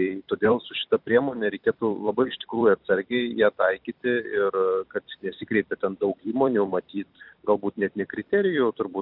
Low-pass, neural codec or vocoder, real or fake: 5.4 kHz; vocoder, 44.1 kHz, 128 mel bands every 256 samples, BigVGAN v2; fake